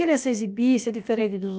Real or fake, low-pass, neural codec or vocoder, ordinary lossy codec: fake; none; codec, 16 kHz, about 1 kbps, DyCAST, with the encoder's durations; none